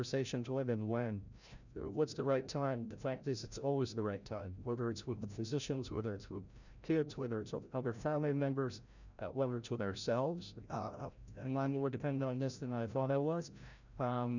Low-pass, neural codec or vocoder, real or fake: 7.2 kHz; codec, 16 kHz, 0.5 kbps, FreqCodec, larger model; fake